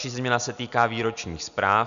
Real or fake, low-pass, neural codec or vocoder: real; 7.2 kHz; none